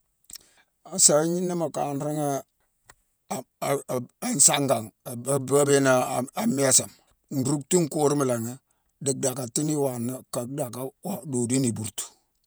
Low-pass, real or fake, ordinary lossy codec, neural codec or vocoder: none; fake; none; vocoder, 48 kHz, 128 mel bands, Vocos